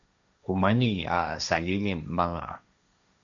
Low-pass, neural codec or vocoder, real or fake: 7.2 kHz; codec, 16 kHz, 1.1 kbps, Voila-Tokenizer; fake